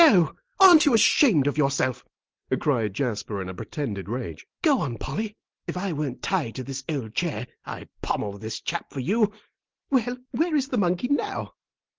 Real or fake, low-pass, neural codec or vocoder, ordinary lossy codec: real; 7.2 kHz; none; Opus, 16 kbps